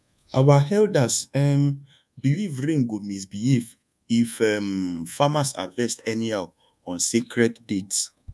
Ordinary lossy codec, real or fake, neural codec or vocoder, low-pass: none; fake; codec, 24 kHz, 1.2 kbps, DualCodec; none